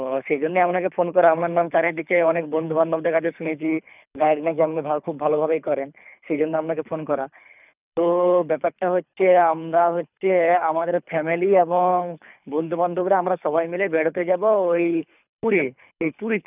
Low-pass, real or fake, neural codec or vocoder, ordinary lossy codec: 3.6 kHz; fake; codec, 24 kHz, 3 kbps, HILCodec; none